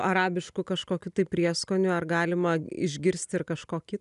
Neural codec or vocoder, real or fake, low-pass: none; real; 10.8 kHz